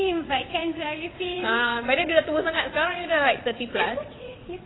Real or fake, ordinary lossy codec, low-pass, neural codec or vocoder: fake; AAC, 16 kbps; 7.2 kHz; vocoder, 22.05 kHz, 80 mel bands, WaveNeXt